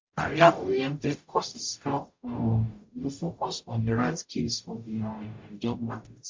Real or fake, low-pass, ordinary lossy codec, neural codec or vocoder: fake; 7.2 kHz; MP3, 48 kbps; codec, 44.1 kHz, 0.9 kbps, DAC